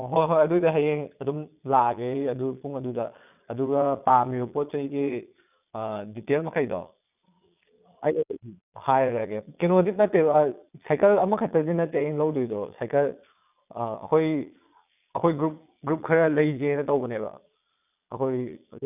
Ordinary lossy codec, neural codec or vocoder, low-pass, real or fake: none; vocoder, 22.05 kHz, 80 mel bands, Vocos; 3.6 kHz; fake